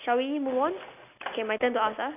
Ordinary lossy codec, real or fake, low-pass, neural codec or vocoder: none; real; 3.6 kHz; none